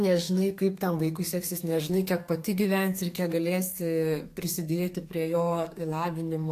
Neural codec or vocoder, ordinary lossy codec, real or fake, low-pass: codec, 32 kHz, 1.9 kbps, SNAC; AAC, 64 kbps; fake; 14.4 kHz